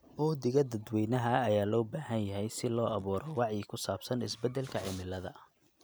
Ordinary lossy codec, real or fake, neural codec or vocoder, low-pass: none; real; none; none